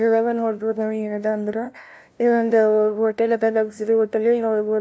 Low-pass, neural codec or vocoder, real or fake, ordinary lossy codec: none; codec, 16 kHz, 0.5 kbps, FunCodec, trained on LibriTTS, 25 frames a second; fake; none